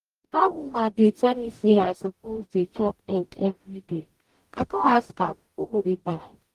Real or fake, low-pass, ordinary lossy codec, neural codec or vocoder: fake; 14.4 kHz; Opus, 24 kbps; codec, 44.1 kHz, 0.9 kbps, DAC